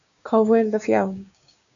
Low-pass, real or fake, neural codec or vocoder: 7.2 kHz; fake; codec, 16 kHz, 6 kbps, DAC